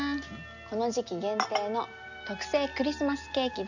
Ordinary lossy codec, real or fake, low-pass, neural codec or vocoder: none; real; 7.2 kHz; none